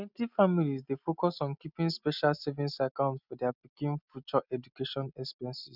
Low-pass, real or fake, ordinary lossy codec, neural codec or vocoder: 5.4 kHz; real; none; none